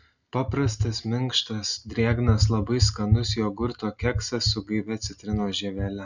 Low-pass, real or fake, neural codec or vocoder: 7.2 kHz; real; none